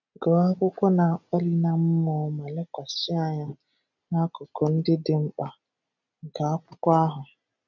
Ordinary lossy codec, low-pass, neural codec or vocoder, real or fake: none; 7.2 kHz; none; real